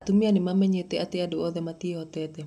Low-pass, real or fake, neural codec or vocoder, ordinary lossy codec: 14.4 kHz; real; none; none